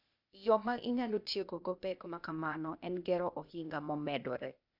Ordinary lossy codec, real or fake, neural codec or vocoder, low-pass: none; fake; codec, 16 kHz, 0.8 kbps, ZipCodec; 5.4 kHz